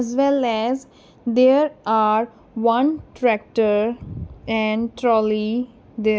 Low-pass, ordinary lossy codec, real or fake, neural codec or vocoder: none; none; real; none